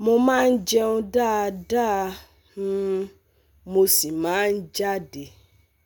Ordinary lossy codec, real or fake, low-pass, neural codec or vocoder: none; real; none; none